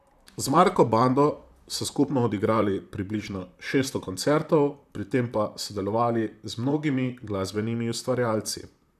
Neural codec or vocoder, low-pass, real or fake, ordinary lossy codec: vocoder, 44.1 kHz, 128 mel bands, Pupu-Vocoder; 14.4 kHz; fake; none